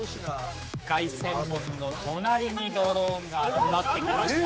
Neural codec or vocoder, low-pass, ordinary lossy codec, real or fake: codec, 16 kHz, 4 kbps, X-Codec, HuBERT features, trained on general audio; none; none; fake